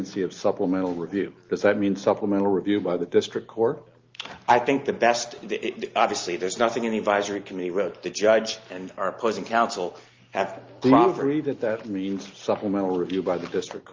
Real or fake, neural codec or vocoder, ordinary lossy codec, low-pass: real; none; Opus, 32 kbps; 7.2 kHz